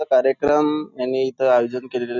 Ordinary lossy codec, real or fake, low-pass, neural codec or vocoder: none; real; 7.2 kHz; none